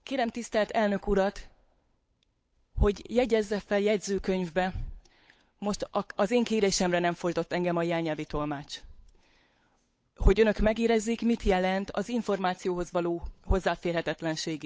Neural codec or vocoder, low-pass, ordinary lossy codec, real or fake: codec, 16 kHz, 8 kbps, FunCodec, trained on Chinese and English, 25 frames a second; none; none; fake